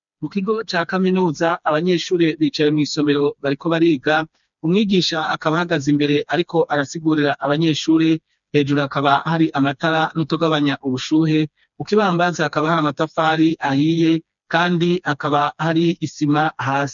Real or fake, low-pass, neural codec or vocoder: fake; 7.2 kHz; codec, 16 kHz, 2 kbps, FreqCodec, smaller model